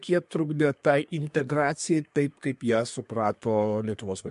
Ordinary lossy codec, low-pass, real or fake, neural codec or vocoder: MP3, 64 kbps; 10.8 kHz; fake; codec, 24 kHz, 1 kbps, SNAC